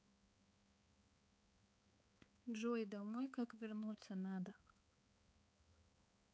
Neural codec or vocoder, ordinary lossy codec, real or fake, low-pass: codec, 16 kHz, 4 kbps, X-Codec, HuBERT features, trained on balanced general audio; none; fake; none